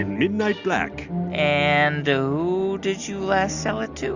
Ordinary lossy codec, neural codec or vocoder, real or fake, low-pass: Opus, 64 kbps; none; real; 7.2 kHz